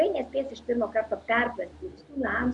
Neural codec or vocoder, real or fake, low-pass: none; real; 10.8 kHz